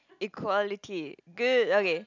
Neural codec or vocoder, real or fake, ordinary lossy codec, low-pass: none; real; none; 7.2 kHz